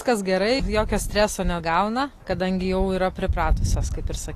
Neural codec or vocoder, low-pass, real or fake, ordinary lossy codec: none; 14.4 kHz; real; AAC, 48 kbps